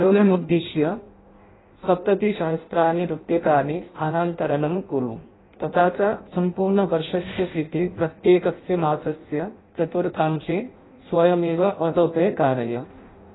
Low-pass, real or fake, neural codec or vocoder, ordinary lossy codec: 7.2 kHz; fake; codec, 16 kHz in and 24 kHz out, 0.6 kbps, FireRedTTS-2 codec; AAC, 16 kbps